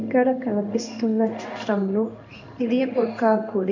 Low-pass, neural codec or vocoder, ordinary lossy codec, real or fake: 7.2 kHz; codec, 16 kHz in and 24 kHz out, 1 kbps, XY-Tokenizer; none; fake